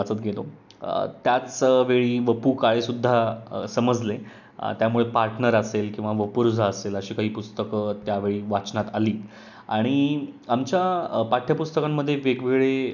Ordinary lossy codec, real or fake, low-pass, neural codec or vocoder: none; real; 7.2 kHz; none